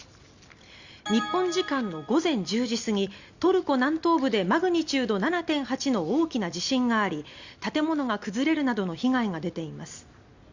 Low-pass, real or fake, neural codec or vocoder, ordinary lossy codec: 7.2 kHz; real; none; Opus, 64 kbps